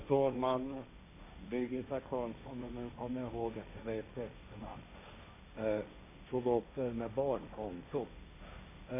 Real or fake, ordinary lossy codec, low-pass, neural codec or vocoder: fake; AAC, 24 kbps; 3.6 kHz; codec, 16 kHz, 1.1 kbps, Voila-Tokenizer